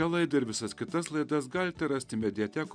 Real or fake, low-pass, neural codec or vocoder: real; 9.9 kHz; none